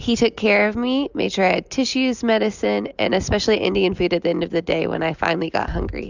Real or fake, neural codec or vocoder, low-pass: real; none; 7.2 kHz